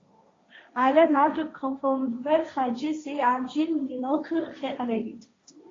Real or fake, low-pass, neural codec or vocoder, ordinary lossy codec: fake; 7.2 kHz; codec, 16 kHz, 1.1 kbps, Voila-Tokenizer; AAC, 32 kbps